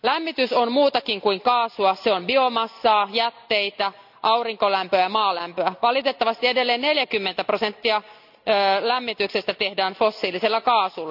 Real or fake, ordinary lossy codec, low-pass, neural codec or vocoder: real; none; 5.4 kHz; none